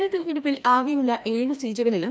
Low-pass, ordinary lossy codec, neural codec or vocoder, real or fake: none; none; codec, 16 kHz, 1 kbps, FreqCodec, larger model; fake